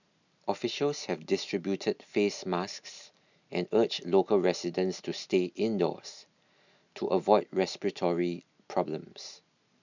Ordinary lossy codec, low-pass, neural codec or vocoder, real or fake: none; 7.2 kHz; none; real